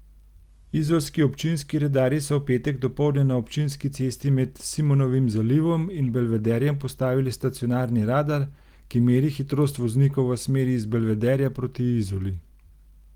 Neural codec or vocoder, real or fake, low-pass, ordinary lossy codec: none; real; 19.8 kHz; Opus, 24 kbps